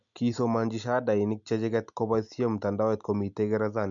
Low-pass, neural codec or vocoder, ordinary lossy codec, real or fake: 7.2 kHz; none; none; real